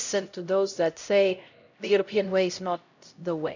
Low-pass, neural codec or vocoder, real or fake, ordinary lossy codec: 7.2 kHz; codec, 16 kHz, 0.5 kbps, X-Codec, HuBERT features, trained on LibriSpeech; fake; none